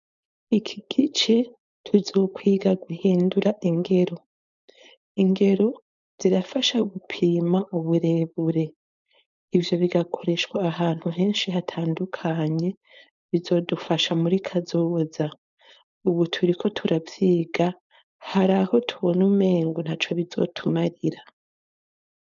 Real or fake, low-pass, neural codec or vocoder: fake; 7.2 kHz; codec, 16 kHz, 4.8 kbps, FACodec